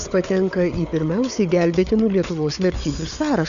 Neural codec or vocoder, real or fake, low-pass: codec, 16 kHz, 4 kbps, FunCodec, trained on Chinese and English, 50 frames a second; fake; 7.2 kHz